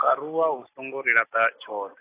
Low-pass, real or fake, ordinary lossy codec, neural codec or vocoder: 3.6 kHz; real; AAC, 16 kbps; none